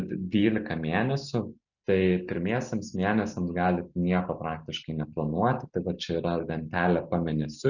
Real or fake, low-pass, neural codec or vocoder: real; 7.2 kHz; none